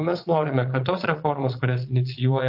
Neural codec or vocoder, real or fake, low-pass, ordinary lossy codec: vocoder, 22.05 kHz, 80 mel bands, Vocos; fake; 5.4 kHz; AAC, 48 kbps